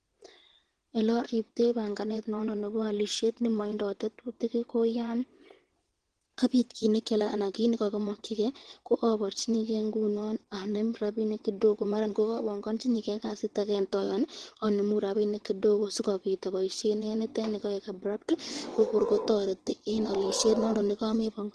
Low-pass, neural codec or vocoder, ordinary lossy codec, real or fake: 9.9 kHz; vocoder, 22.05 kHz, 80 mel bands, WaveNeXt; Opus, 16 kbps; fake